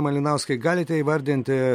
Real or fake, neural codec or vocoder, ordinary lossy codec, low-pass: real; none; MP3, 64 kbps; 19.8 kHz